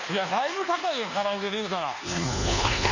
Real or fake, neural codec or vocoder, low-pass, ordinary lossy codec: fake; codec, 24 kHz, 1.2 kbps, DualCodec; 7.2 kHz; none